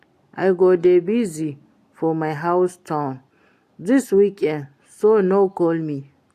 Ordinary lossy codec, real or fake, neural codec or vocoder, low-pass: AAC, 64 kbps; real; none; 14.4 kHz